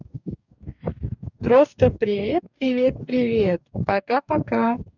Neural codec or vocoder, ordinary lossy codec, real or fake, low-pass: codec, 44.1 kHz, 2.6 kbps, DAC; none; fake; 7.2 kHz